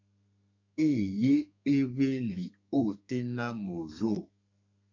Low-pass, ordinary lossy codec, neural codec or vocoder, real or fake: 7.2 kHz; AAC, 48 kbps; codec, 32 kHz, 1.9 kbps, SNAC; fake